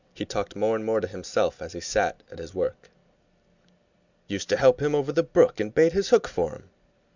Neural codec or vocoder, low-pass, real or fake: none; 7.2 kHz; real